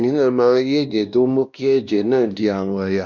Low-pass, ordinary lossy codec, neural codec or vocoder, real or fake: 7.2 kHz; Opus, 64 kbps; codec, 16 kHz, 1 kbps, X-Codec, WavLM features, trained on Multilingual LibriSpeech; fake